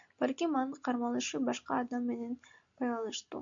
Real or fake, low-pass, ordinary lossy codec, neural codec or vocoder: real; 7.2 kHz; MP3, 64 kbps; none